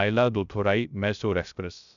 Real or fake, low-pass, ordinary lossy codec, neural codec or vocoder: fake; 7.2 kHz; none; codec, 16 kHz, 0.3 kbps, FocalCodec